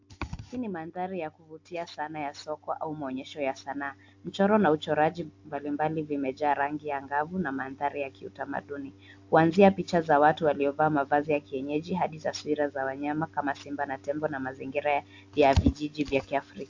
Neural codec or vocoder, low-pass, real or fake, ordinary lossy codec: none; 7.2 kHz; real; AAC, 48 kbps